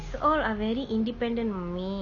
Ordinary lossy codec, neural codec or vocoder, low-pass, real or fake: none; none; 7.2 kHz; real